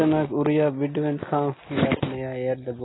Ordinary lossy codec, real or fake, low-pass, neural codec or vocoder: AAC, 16 kbps; real; 7.2 kHz; none